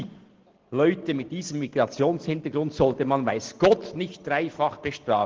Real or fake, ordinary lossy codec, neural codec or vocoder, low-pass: real; Opus, 16 kbps; none; 7.2 kHz